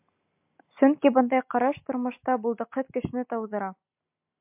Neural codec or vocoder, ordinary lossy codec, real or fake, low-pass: none; MP3, 32 kbps; real; 3.6 kHz